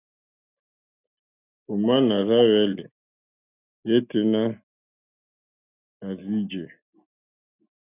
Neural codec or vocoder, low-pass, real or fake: none; 3.6 kHz; real